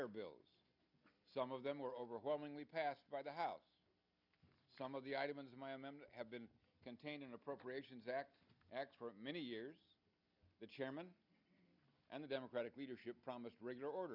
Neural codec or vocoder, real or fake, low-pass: none; real; 5.4 kHz